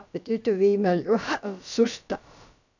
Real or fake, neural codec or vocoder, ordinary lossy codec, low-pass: fake; codec, 16 kHz, about 1 kbps, DyCAST, with the encoder's durations; none; 7.2 kHz